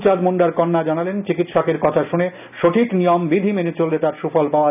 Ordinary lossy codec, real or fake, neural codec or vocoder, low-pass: none; real; none; 3.6 kHz